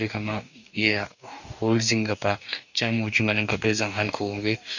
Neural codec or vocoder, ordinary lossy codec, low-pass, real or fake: codec, 44.1 kHz, 2.6 kbps, DAC; none; 7.2 kHz; fake